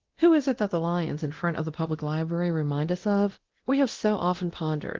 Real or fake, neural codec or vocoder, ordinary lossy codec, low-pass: fake; codec, 24 kHz, 0.9 kbps, DualCodec; Opus, 16 kbps; 7.2 kHz